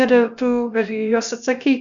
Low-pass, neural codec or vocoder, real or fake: 7.2 kHz; codec, 16 kHz, about 1 kbps, DyCAST, with the encoder's durations; fake